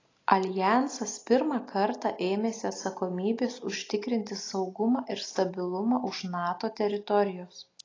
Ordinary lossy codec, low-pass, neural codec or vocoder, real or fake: AAC, 32 kbps; 7.2 kHz; none; real